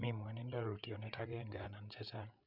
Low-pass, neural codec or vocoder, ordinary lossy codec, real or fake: 5.4 kHz; codec, 16 kHz, 8 kbps, FreqCodec, larger model; none; fake